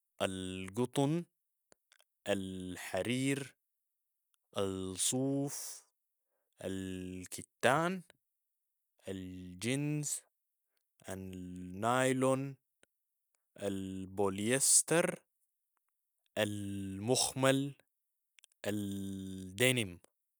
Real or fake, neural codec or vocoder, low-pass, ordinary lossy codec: real; none; none; none